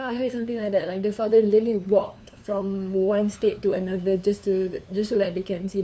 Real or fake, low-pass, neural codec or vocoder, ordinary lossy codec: fake; none; codec, 16 kHz, 2 kbps, FunCodec, trained on LibriTTS, 25 frames a second; none